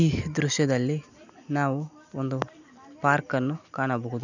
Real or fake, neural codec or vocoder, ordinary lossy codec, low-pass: real; none; none; 7.2 kHz